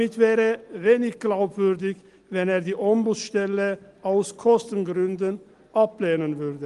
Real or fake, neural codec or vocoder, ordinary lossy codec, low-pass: real; none; Opus, 24 kbps; 10.8 kHz